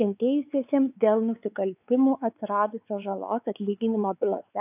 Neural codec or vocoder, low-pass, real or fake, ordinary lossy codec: codec, 16 kHz, 4 kbps, X-Codec, WavLM features, trained on Multilingual LibriSpeech; 3.6 kHz; fake; AAC, 32 kbps